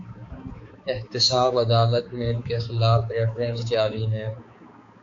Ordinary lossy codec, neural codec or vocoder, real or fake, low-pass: AAC, 48 kbps; codec, 16 kHz, 4 kbps, X-Codec, HuBERT features, trained on balanced general audio; fake; 7.2 kHz